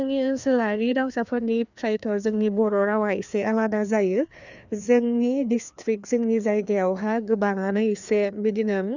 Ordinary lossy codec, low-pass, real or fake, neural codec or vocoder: none; 7.2 kHz; fake; codec, 16 kHz, 2 kbps, FreqCodec, larger model